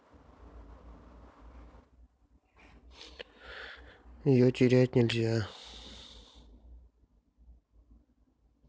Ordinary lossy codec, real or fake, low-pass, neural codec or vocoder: none; real; none; none